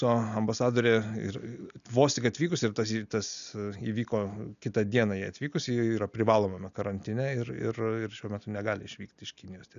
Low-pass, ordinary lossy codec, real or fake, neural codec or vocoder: 7.2 kHz; MP3, 96 kbps; real; none